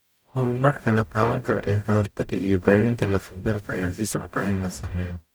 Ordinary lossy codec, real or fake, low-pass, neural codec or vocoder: none; fake; none; codec, 44.1 kHz, 0.9 kbps, DAC